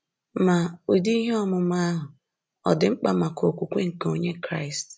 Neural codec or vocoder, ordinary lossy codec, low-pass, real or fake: none; none; none; real